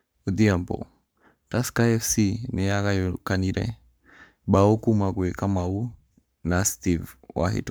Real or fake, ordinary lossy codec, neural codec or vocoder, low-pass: fake; none; codec, 44.1 kHz, 7.8 kbps, Pupu-Codec; none